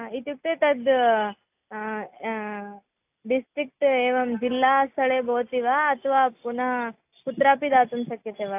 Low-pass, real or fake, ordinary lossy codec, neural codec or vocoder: 3.6 kHz; real; AAC, 32 kbps; none